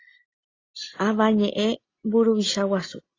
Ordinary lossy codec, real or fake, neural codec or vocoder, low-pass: AAC, 32 kbps; real; none; 7.2 kHz